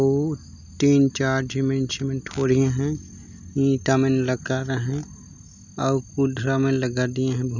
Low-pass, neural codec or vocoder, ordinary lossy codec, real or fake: 7.2 kHz; none; none; real